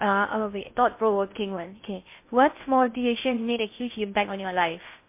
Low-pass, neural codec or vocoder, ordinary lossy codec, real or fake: 3.6 kHz; codec, 16 kHz in and 24 kHz out, 0.6 kbps, FocalCodec, streaming, 2048 codes; MP3, 24 kbps; fake